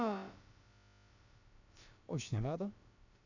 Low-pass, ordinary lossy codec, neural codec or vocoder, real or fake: 7.2 kHz; none; codec, 16 kHz, about 1 kbps, DyCAST, with the encoder's durations; fake